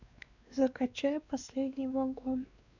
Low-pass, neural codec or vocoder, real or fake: 7.2 kHz; codec, 16 kHz, 2 kbps, X-Codec, WavLM features, trained on Multilingual LibriSpeech; fake